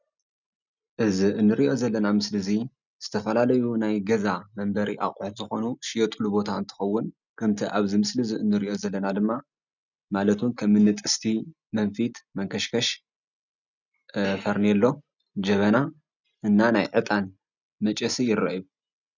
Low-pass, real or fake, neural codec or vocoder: 7.2 kHz; real; none